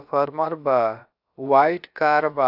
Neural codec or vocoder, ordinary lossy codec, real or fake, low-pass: codec, 16 kHz, about 1 kbps, DyCAST, with the encoder's durations; AAC, 48 kbps; fake; 5.4 kHz